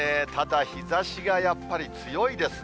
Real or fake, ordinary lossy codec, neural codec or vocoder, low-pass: real; none; none; none